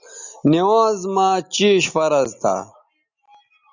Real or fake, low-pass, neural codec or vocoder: real; 7.2 kHz; none